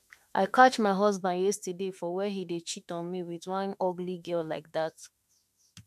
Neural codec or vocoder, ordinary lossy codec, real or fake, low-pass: autoencoder, 48 kHz, 32 numbers a frame, DAC-VAE, trained on Japanese speech; MP3, 96 kbps; fake; 14.4 kHz